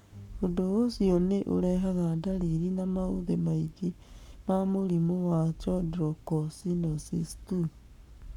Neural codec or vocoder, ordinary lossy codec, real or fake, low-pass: codec, 44.1 kHz, 7.8 kbps, Pupu-Codec; none; fake; 19.8 kHz